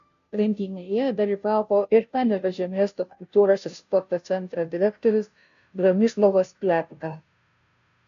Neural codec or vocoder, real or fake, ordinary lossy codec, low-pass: codec, 16 kHz, 0.5 kbps, FunCodec, trained on Chinese and English, 25 frames a second; fake; AAC, 96 kbps; 7.2 kHz